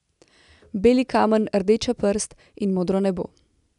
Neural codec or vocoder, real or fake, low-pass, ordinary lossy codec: none; real; 10.8 kHz; none